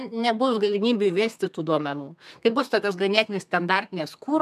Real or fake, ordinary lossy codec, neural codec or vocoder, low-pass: fake; MP3, 96 kbps; codec, 32 kHz, 1.9 kbps, SNAC; 14.4 kHz